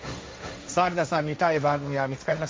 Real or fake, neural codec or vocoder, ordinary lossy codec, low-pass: fake; codec, 16 kHz, 1.1 kbps, Voila-Tokenizer; none; none